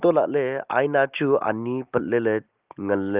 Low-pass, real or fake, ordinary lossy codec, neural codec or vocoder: 3.6 kHz; real; Opus, 32 kbps; none